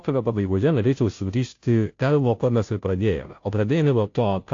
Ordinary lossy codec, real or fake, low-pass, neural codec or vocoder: AAC, 48 kbps; fake; 7.2 kHz; codec, 16 kHz, 0.5 kbps, FunCodec, trained on Chinese and English, 25 frames a second